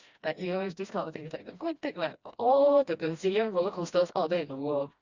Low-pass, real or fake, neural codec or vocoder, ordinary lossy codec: 7.2 kHz; fake; codec, 16 kHz, 1 kbps, FreqCodec, smaller model; Opus, 64 kbps